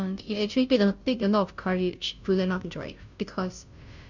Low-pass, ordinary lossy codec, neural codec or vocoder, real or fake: 7.2 kHz; none; codec, 16 kHz, 0.5 kbps, FunCodec, trained on Chinese and English, 25 frames a second; fake